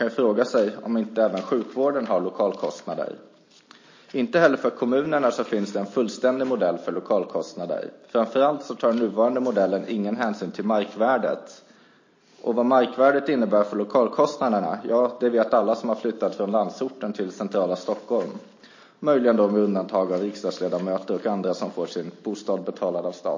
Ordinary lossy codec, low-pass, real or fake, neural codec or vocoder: MP3, 32 kbps; 7.2 kHz; real; none